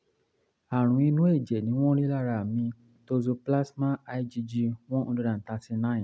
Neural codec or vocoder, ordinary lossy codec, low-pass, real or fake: none; none; none; real